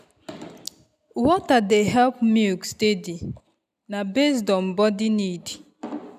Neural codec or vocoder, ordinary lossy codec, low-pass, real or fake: none; none; 14.4 kHz; real